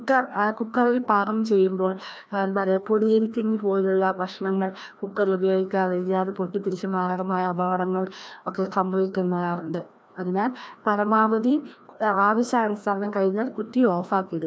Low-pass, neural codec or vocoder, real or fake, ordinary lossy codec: none; codec, 16 kHz, 1 kbps, FreqCodec, larger model; fake; none